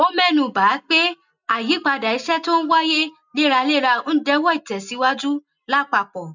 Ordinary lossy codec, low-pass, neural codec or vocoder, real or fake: none; 7.2 kHz; none; real